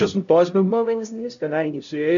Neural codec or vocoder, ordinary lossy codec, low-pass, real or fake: codec, 16 kHz, 0.5 kbps, X-Codec, HuBERT features, trained on LibriSpeech; MP3, 96 kbps; 7.2 kHz; fake